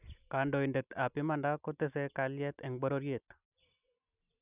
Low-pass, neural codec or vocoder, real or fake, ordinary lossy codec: 3.6 kHz; none; real; none